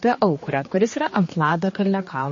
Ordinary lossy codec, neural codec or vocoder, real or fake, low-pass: MP3, 32 kbps; codec, 16 kHz, 2 kbps, X-Codec, HuBERT features, trained on general audio; fake; 7.2 kHz